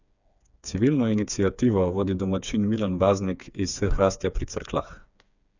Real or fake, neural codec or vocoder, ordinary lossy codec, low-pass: fake; codec, 16 kHz, 4 kbps, FreqCodec, smaller model; none; 7.2 kHz